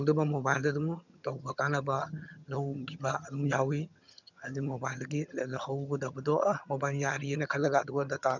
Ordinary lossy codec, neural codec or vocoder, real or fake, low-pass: none; vocoder, 22.05 kHz, 80 mel bands, HiFi-GAN; fake; 7.2 kHz